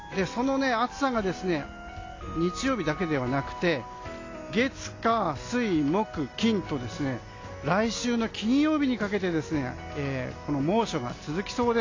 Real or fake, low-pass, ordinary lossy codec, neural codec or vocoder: real; 7.2 kHz; AAC, 32 kbps; none